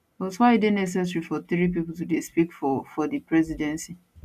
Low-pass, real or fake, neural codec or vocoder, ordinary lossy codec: 14.4 kHz; real; none; none